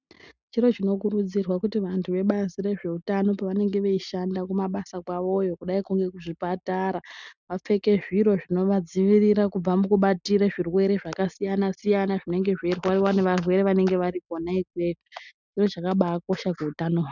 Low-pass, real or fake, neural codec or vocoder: 7.2 kHz; real; none